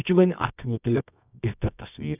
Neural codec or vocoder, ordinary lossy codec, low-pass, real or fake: codec, 24 kHz, 0.9 kbps, WavTokenizer, medium music audio release; none; 3.6 kHz; fake